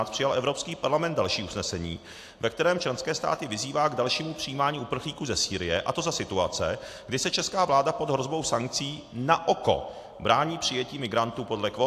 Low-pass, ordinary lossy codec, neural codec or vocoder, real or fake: 14.4 kHz; AAC, 64 kbps; none; real